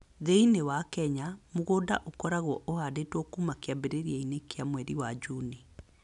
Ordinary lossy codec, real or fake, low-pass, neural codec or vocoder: none; real; 10.8 kHz; none